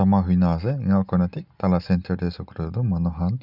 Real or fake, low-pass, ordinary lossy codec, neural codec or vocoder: real; 5.4 kHz; none; none